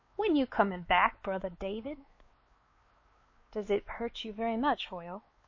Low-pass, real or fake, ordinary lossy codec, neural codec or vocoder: 7.2 kHz; fake; MP3, 32 kbps; codec, 16 kHz, 4 kbps, X-Codec, WavLM features, trained on Multilingual LibriSpeech